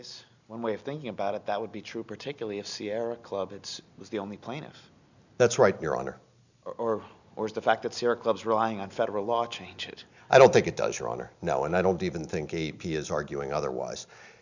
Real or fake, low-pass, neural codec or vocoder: real; 7.2 kHz; none